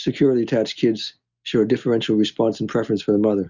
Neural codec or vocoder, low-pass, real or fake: none; 7.2 kHz; real